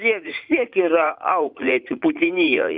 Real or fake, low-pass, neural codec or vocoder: fake; 5.4 kHz; vocoder, 44.1 kHz, 80 mel bands, Vocos